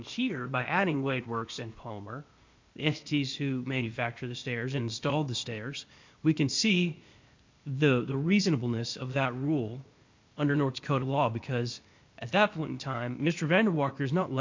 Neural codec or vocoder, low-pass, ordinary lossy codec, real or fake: codec, 16 kHz, 0.8 kbps, ZipCodec; 7.2 kHz; MP3, 64 kbps; fake